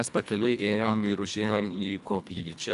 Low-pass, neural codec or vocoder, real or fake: 10.8 kHz; codec, 24 kHz, 1.5 kbps, HILCodec; fake